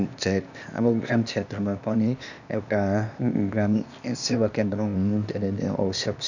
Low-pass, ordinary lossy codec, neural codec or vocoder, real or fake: 7.2 kHz; none; codec, 16 kHz, 0.8 kbps, ZipCodec; fake